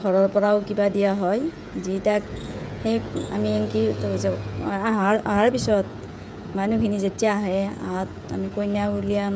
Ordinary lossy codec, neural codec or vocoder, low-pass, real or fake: none; codec, 16 kHz, 16 kbps, FreqCodec, smaller model; none; fake